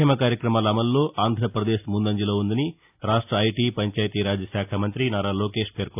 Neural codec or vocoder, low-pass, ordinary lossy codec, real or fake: none; 3.6 kHz; AAC, 32 kbps; real